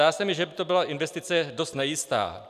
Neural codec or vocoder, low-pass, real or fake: none; 14.4 kHz; real